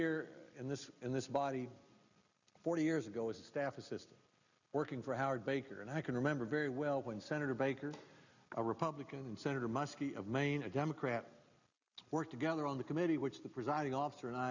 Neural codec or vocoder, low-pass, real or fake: none; 7.2 kHz; real